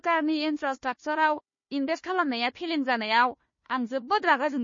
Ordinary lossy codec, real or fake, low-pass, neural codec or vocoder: MP3, 32 kbps; fake; 7.2 kHz; codec, 16 kHz, 1 kbps, FunCodec, trained on Chinese and English, 50 frames a second